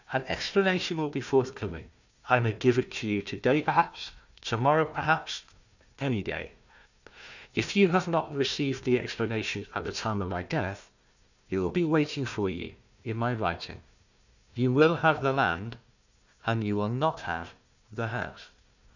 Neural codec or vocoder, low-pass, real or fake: codec, 16 kHz, 1 kbps, FunCodec, trained on Chinese and English, 50 frames a second; 7.2 kHz; fake